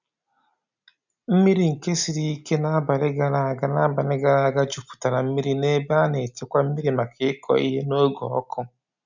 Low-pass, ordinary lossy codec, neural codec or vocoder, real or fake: 7.2 kHz; none; none; real